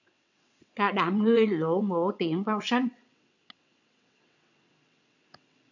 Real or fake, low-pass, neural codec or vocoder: fake; 7.2 kHz; vocoder, 22.05 kHz, 80 mel bands, WaveNeXt